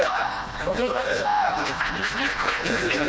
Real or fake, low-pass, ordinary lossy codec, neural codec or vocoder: fake; none; none; codec, 16 kHz, 1 kbps, FreqCodec, smaller model